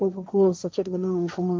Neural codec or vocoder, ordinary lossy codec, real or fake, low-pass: codec, 16 kHz, 1.1 kbps, Voila-Tokenizer; none; fake; none